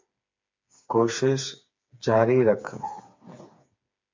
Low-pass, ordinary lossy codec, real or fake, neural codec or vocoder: 7.2 kHz; MP3, 48 kbps; fake; codec, 16 kHz, 4 kbps, FreqCodec, smaller model